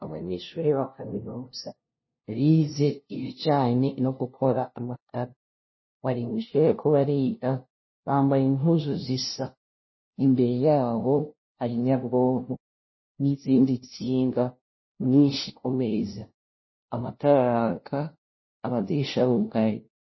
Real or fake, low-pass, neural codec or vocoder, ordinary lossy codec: fake; 7.2 kHz; codec, 16 kHz, 0.5 kbps, FunCodec, trained on LibriTTS, 25 frames a second; MP3, 24 kbps